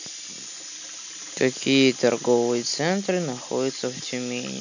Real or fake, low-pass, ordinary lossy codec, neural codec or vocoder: real; 7.2 kHz; none; none